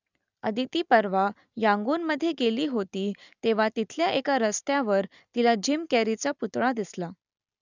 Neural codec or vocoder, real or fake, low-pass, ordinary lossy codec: none; real; 7.2 kHz; none